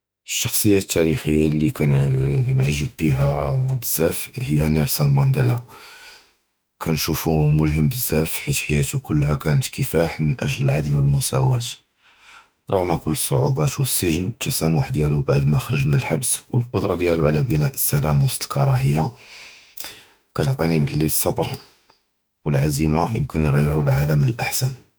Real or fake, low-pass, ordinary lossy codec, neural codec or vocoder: fake; none; none; autoencoder, 48 kHz, 32 numbers a frame, DAC-VAE, trained on Japanese speech